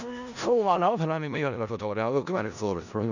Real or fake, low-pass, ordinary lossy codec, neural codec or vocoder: fake; 7.2 kHz; none; codec, 16 kHz in and 24 kHz out, 0.4 kbps, LongCat-Audio-Codec, four codebook decoder